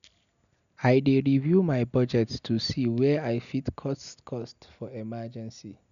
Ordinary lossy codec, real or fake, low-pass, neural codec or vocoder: none; real; 7.2 kHz; none